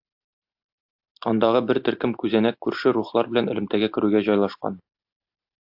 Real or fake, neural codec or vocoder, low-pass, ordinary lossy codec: real; none; 5.4 kHz; AAC, 48 kbps